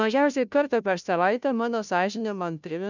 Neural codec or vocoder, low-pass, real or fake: codec, 16 kHz, 0.5 kbps, FunCodec, trained on Chinese and English, 25 frames a second; 7.2 kHz; fake